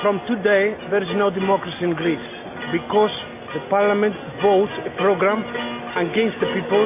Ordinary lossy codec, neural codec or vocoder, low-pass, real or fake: MP3, 32 kbps; none; 3.6 kHz; real